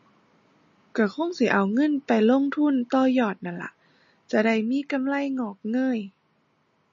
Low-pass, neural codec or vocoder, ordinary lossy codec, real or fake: 7.2 kHz; none; MP3, 32 kbps; real